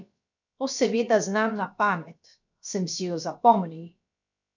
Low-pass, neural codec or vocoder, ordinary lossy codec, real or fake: 7.2 kHz; codec, 16 kHz, about 1 kbps, DyCAST, with the encoder's durations; none; fake